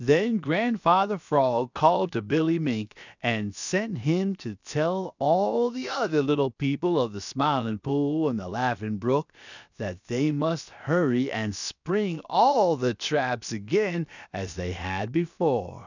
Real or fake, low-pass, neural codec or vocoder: fake; 7.2 kHz; codec, 16 kHz, 0.7 kbps, FocalCodec